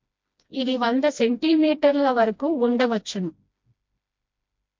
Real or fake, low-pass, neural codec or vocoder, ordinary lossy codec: fake; 7.2 kHz; codec, 16 kHz, 1 kbps, FreqCodec, smaller model; MP3, 48 kbps